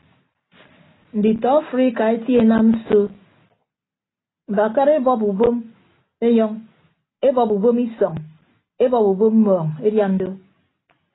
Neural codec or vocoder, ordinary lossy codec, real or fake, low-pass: none; AAC, 16 kbps; real; 7.2 kHz